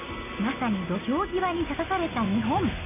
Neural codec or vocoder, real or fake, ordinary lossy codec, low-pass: none; real; none; 3.6 kHz